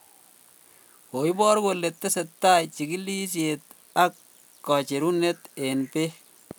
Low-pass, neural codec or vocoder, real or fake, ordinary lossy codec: none; none; real; none